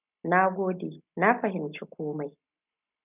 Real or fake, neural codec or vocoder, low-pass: real; none; 3.6 kHz